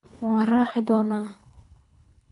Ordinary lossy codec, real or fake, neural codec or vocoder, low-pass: none; fake; codec, 24 kHz, 3 kbps, HILCodec; 10.8 kHz